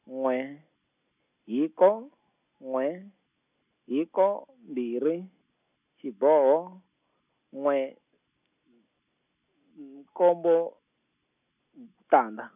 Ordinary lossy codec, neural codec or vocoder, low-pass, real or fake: none; none; 3.6 kHz; real